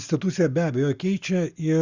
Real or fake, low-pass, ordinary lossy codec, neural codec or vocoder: real; 7.2 kHz; Opus, 64 kbps; none